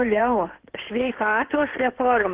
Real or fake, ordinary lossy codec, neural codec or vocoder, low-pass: fake; Opus, 16 kbps; codec, 16 kHz in and 24 kHz out, 2.2 kbps, FireRedTTS-2 codec; 3.6 kHz